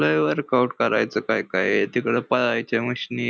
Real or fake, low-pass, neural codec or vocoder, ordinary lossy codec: real; none; none; none